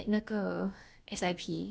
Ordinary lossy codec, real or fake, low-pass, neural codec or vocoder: none; fake; none; codec, 16 kHz, about 1 kbps, DyCAST, with the encoder's durations